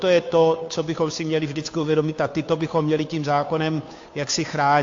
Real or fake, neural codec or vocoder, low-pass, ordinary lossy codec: fake; codec, 16 kHz, 2 kbps, FunCodec, trained on Chinese and English, 25 frames a second; 7.2 kHz; AAC, 48 kbps